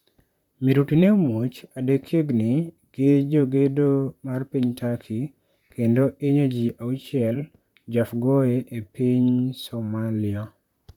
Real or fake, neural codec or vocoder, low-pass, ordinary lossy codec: fake; vocoder, 44.1 kHz, 128 mel bands, Pupu-Vocoder; 19.8 kHz; none